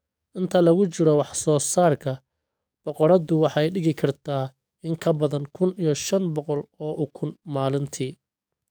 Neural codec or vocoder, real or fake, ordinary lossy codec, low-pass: codec, 44.1 kHz, 7.8 kbps, DAC; fake; none; none